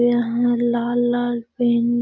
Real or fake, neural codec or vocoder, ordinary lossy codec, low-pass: real; none; none; none